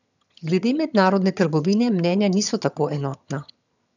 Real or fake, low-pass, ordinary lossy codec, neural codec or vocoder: fake; 7.2 kHz; none; vocoder, 22.05 kHz, 80 mel bands, HiFi-GAN